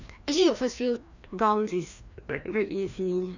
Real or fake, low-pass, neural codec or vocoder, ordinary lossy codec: fake; 7.2 kHz; codec, 16 kHz, 1 kbps, FreqCodec, larger model; none